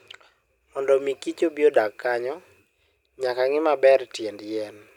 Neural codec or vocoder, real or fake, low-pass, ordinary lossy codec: none; real; 19.8 kHz; none